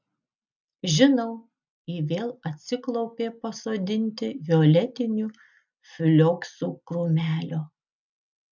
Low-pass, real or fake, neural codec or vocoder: 7.2 kHz; real; none